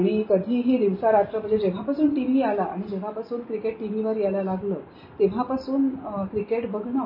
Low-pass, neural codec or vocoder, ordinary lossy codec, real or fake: 5.4 kHz; none; MP3, 24 kbps; real